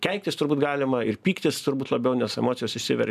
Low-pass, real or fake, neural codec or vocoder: 14.4 kHz; real; none